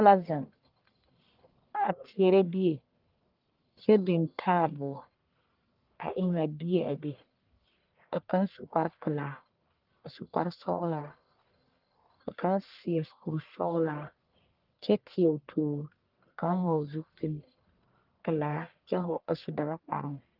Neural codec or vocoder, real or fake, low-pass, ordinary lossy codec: codec, 44.1 kHz, 1.7 kbps, Pupu-Codec; fake; 5.4 kHz; Opus, 24 kbps